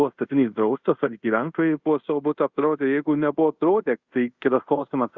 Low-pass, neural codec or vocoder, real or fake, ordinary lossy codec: 7.2 kHz; codec, 24 kHz, 0.5 kbps, DualCodec; fake; Opus, 64 kbps